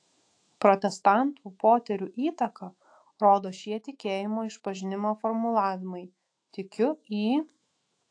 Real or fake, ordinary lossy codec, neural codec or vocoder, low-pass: fake; AAC, 48 kbps; autoencoder, 48 kHz, 128 numbers a frame, DAC-VAE, trained on Japanese speech; 9.9 kHz